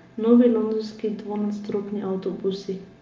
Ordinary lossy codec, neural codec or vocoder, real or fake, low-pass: Opus, 24 kbps; none; real; 7.2 kHz